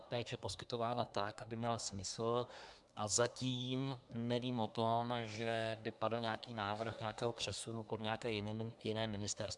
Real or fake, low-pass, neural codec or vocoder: fake; 10.8 kHz; codec, 24 kHz, 1 kbps, SNAC